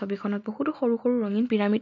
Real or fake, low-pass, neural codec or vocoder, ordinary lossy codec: real; 7.2 kHz; none; MP3, 48 kbps